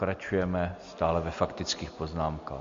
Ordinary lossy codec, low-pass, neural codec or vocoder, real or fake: MP3, 48 kbps; 7.2 kHz; none; real